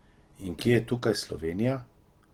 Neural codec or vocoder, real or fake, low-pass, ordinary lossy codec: none; real; 19.8 kHz; Opus, 16 kbps